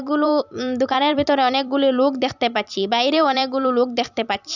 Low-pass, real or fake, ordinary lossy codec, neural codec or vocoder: 7.2 kHz; fake; none; vocoder, 44.1 kHz, 128 mel bands every 256 samples, BigVGAN v2